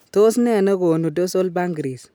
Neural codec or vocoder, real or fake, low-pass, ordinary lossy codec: none; real; none; none